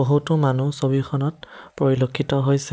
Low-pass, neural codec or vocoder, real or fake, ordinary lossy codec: none; none; real; none